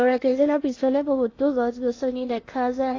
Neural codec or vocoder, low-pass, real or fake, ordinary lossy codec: codec, 16 kHz in and 24 kHz out, 0.8 kbps, FocalCodec, streaming, 65536 codes; 7.2 kHz; fake; AAC, 32 kbps